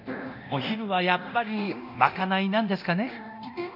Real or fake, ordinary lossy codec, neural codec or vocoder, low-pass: fake; none; codec, 24 kHz, 0.9 kbps, DualCodec; 5.4 kHz